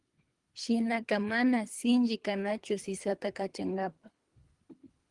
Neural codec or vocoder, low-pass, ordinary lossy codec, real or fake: codec, 24 kHz, 3 kbps, HILCodec; 10.8 kHz; Opus, 24 kbps; fake